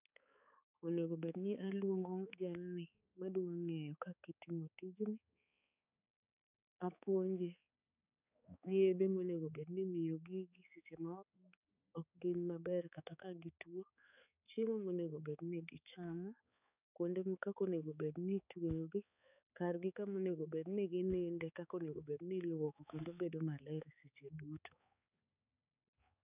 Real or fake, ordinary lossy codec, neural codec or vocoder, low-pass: fake; none; codec, 16 kHz, 4 kbps, X-Codec, HuBERT features, trained on balanced general audio; 3.6 kHz